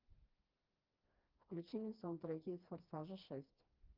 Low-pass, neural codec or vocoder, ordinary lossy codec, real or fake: 5.4 kHz; codec, 16 kHz, 2 kbps, FreqCodec, smaller model; Opus, 24 kbps; fake